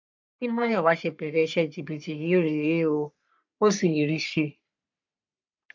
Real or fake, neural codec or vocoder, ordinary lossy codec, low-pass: fake; codec, 44.1 kHz, 3.4 kbps, Pupu-Codec; MP3, 64 kbps; 7.2 kHz